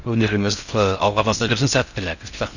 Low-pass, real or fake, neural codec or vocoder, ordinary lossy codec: 7.2 kHz; fake; codec, 16 kHz in and 24 kHz out, 0.6 kbps, FocalCodec, streaming, 4096 codes; none